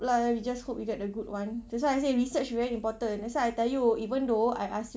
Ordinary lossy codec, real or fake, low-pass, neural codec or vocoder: none; real; none; none